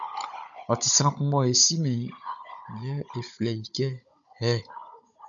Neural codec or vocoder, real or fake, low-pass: codec, 16 kHz, 16 kbps, FunCodec, trained on Chinese and English, 50 frames a second; fake; 7.2 kHz